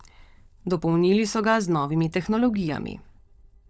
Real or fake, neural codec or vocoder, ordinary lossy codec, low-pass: fake; codec, 16 kHz, 16 kbps, FunCodec, trained on LibriTTS, 50 frames a second; none; none